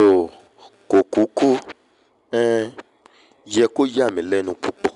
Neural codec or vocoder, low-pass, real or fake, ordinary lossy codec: none; 10.8 kHz; real; none